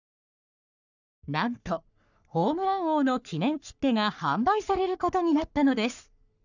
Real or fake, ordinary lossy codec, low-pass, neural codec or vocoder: fake; none; 7.2 kHz; codec, 44.1 kHz, 3.4 kbps, Pupu-Codec